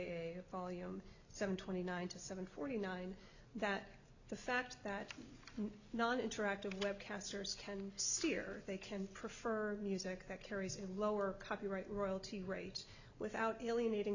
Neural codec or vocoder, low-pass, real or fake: none; 7.2 kHz; real